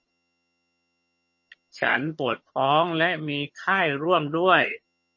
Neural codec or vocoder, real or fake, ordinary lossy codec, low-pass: vocoder, 22.05 kHz, 80 mel bands, HiFi-GAN; fake; MP3, 32 kbps; 7.2 kHz